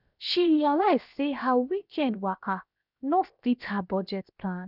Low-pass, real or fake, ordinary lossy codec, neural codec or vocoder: 5.4 kHz; fake; none; codec, 16 kHz, 0.7 kbps, FocalCodec